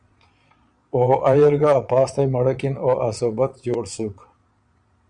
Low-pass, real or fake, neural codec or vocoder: 9.9 kHz; fake; vocoder, 22.05 kHz, 80 mel bands, Vocos